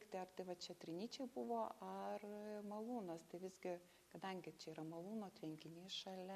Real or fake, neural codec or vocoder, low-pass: real; none; 14.4 kHz